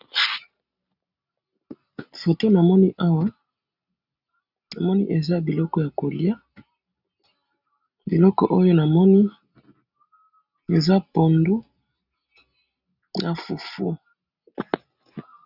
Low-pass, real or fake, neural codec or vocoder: 5.4 kHz; real; none